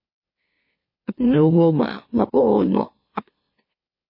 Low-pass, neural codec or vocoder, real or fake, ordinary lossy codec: 5.4 kHz; autoencoder, 44.1 kHz, a latent of 192 numbers a frame, MeloTTS; fake; MP3, 24 kbps